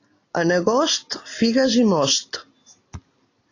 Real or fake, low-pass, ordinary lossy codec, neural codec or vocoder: real; 7.2 kHz; AAC, 32 kbps; none